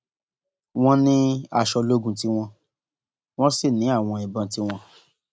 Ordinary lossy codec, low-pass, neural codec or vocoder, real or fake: none; none; none; real